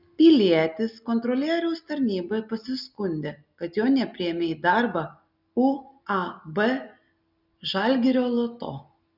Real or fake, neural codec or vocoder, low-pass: real; none; 5.4 kHz